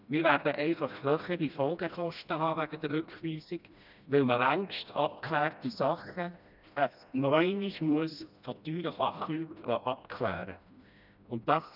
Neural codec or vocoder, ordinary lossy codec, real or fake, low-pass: codec, 16 kHz, 1 kbps, FreqCodec, smaller model; none; fake; 5.4 kHz